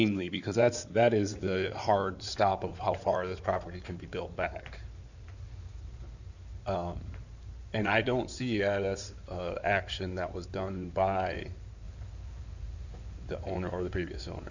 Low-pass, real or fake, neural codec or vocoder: 7.2 kHz; fake; codec, 16 kHz in and 24 kHz out, 2.2 kbps, FireRedTTS-2 codec